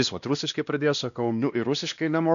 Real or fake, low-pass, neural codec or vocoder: fake; 7.2 kHz; codec, 16 kHz, 1 kbps, X-Codec, WavLM features, trained on Multilingual LibriSpeech